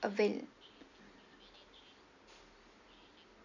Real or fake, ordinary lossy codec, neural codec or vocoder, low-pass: real; none; none; 7.2 kHz